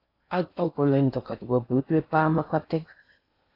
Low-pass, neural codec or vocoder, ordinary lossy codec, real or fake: 5.4 kHz; codec, 16 kHz in and 24 kHz out, 0.6 kbps, FocalCodec, streaming, 4096 codes; AAC, 32 kbps; fake